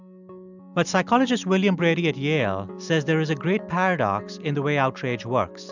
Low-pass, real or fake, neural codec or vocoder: 7.2 kHz; real; none